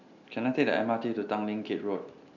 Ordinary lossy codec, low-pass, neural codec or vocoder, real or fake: none; 7.2 kHz; none; real